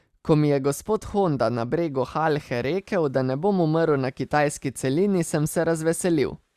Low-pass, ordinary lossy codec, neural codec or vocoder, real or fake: 14.4 kHz; Opus, 64 kbps; none; real